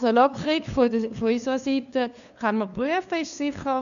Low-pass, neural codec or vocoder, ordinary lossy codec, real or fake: 7.2 kHz; codec, 16 kHz, 2 kbps, FunCodec, trained on LibriTTS, 25 frames a second; none; fake